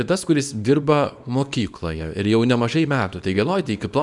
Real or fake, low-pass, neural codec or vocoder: fake; 10.8 kHz; codec, 24 kHz, 0.9 kbps, WavTokenizer, small release